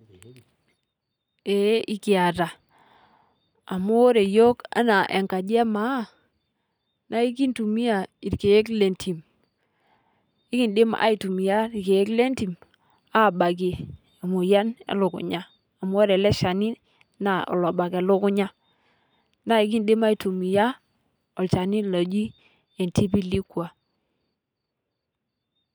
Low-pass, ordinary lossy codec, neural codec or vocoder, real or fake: none; none; none; real